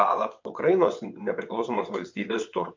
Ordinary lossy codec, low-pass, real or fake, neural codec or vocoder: MP3, 48 kbps; 7.2 kHz; fake; vocoder, 22.05 kHz, 80 mel bands, WaveNeXt